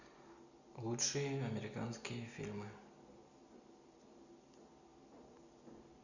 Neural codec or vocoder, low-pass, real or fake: none; 7.2 kHz; real